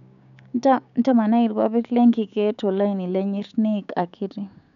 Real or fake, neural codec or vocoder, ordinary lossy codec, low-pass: fake; codec, 16 kHz, 6 kbps, DAC; none; 7.2 kHz